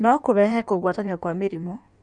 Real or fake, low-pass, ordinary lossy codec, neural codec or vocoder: fake; 9.9 kHz; none; codec, 16 kHz in and 24 kHz out, 1.1 kbps, FireRedTTS-2 codec